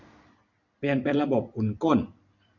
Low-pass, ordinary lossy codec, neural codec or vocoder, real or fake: 7.2 kHz; none; vocoder, 44.1 kHz, 128 mel bands every 256 samples, BigVGAN v2; fake